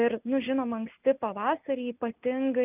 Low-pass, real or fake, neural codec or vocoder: 3.6 kHz; real; none